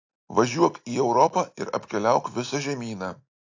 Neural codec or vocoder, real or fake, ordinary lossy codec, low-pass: none; real; AAC, 48 kbps; 7.2 kHz